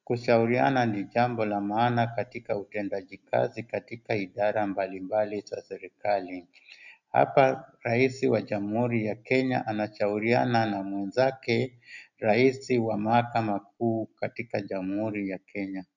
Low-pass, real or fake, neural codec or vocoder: 7.2 kHz; real; none